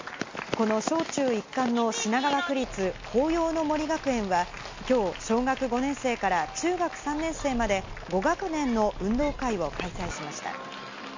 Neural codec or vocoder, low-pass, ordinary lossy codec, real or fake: none; 7.2 kHz; MP3, 48 kbps; real